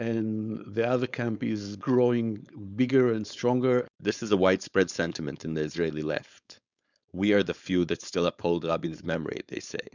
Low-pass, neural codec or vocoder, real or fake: 7.2 kHz; codec, 16 kHz, 4.8 kbps, FACodec; fake